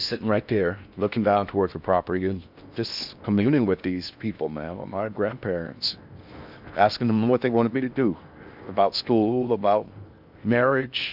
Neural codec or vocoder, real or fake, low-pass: codec, 16 kHz in and 24 kHz out, 0.6 kbps, FocalCodec, streaming, 4096 codes; fake; 5.4 kHz